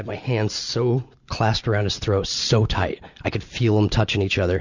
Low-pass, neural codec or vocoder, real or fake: 7.2 kHz; none; real